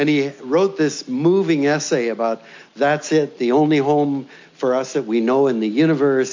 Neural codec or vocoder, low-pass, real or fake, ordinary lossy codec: none; 7.2 kHz; real; MP3, 48 kbps